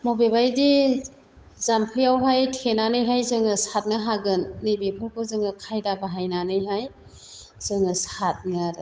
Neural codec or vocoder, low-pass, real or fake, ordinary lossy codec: codec, 16 kHz, 8 kbps, FunCodec, trained on Chinese and English, 25 frames a second; none; fake; none